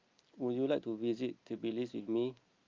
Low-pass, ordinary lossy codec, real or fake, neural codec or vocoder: 7.2 kHz; Opus, 24 kbps; real; none